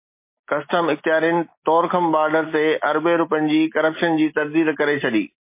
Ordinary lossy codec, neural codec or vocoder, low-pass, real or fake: MP3, 24 kbps; none; 3.6 kHz; real